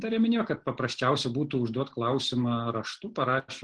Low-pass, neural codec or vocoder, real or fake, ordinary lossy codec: 9.9 kHz; none; real; Opus, 16 kbps